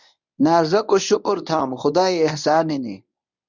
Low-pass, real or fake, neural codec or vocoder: 7.2 kHz; fake; codec, 24 kHz, 0.9 kbps, WavTokenizer, medium speech release version 1